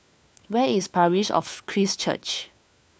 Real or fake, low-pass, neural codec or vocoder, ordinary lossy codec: fake; none; codec, 16 kHz, 4 kbps, FunCodec, trained on LibriTTS, 50 frames a second; none